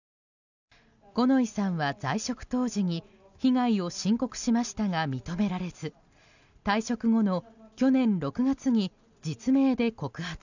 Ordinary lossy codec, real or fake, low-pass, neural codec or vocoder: none; real; 7.2 kHz; none